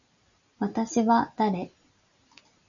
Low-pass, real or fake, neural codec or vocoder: 7.2 kHz; real; none